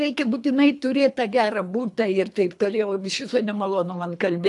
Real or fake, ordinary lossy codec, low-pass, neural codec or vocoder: fake; AAC, 64 kbps; 10.8 kHz; codec, 24 kHz, 3 kbps, HILCodec